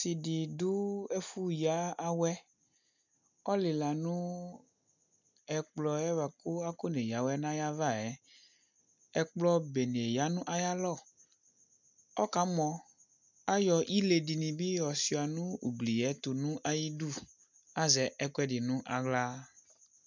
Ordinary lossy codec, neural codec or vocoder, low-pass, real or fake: MP3, 64 kbps; none; 7.2 kHz; real